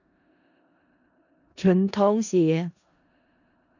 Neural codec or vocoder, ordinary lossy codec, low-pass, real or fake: codec, 16 kHz in and 24 kHz out, 0.4 kbps, LongCat-Audio-Codec, four codebook decoder; none; 7.2 kHz; fake